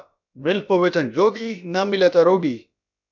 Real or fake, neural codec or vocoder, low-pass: fake; codec, 16 kHz, about 1 kbps, DyCAST, with the encoder's durations; 7.2 kHz